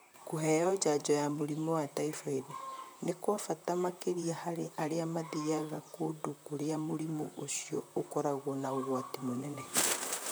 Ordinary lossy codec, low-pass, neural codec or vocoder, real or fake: none; none; vocoder, 44.1 kHz, 128 mel bands, Pupu-Vocoder; fake